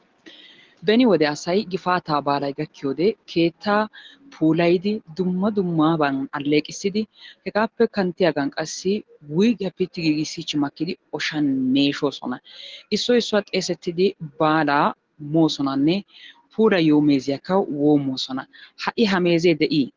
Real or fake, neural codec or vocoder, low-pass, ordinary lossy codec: real; none; 7.2 kHz; Opus, 16 kbps